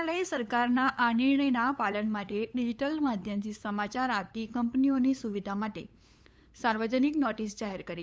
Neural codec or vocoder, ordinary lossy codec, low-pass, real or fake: codec, 16 kHz, 8 kbps, FunCodec, trained on LibriTTS, 25 frames a second; none; none; fake